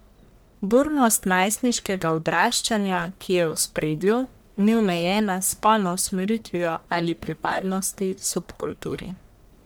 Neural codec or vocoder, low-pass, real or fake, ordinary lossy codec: codec, 44.1 kHz, 1.7 kbps, Pupu-Codec; none; fake; none